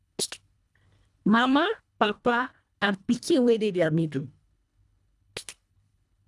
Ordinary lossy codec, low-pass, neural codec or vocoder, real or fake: none; none; codec, 24 kHz, 1.5 kbps, HILCodec; fake